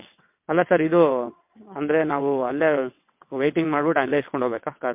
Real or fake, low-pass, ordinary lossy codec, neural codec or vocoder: fake; 3.6 kHz; MP3, 32 kbps; vocoder, 22.05 kHz, 80 mel bands, WaveNeXt